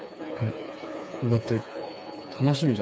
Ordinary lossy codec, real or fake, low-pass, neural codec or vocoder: none; fake; none; codec, 16 kHz, 4 kbps, FreqCodec, smaller model